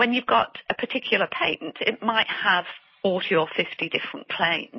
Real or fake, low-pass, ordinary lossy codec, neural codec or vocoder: real; 7.2 kHz; MP3, 24 kbps; none